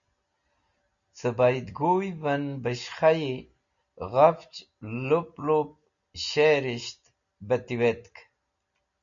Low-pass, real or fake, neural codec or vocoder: 7.2 kHz; real; none